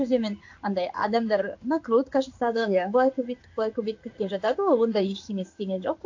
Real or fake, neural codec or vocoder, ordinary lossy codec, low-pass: fake; codec, 16 kHz in and 24 kHz out, 1 kbps, XY-Tokenizer; AAC, 48 kbps; 7.2 kHz